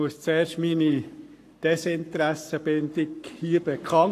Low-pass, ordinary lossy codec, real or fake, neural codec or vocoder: 14.4 kHz; AAC, 64 kbps; fake; codec, 44.1 kHz, 7.8 kbps, Pupu-Codec